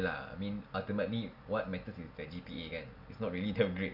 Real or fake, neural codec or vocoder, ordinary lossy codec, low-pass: real; none; none; 5.4 kHz